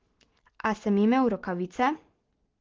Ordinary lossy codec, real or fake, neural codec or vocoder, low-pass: Opus, 16 kbps; real; none; 7.2 kHz